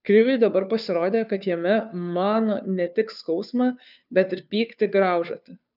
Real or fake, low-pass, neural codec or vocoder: fake; 5.4 kHz; codec, 16 kHz, 2 kbps, FunCodec, trained on Chinese and English, 25 frames a second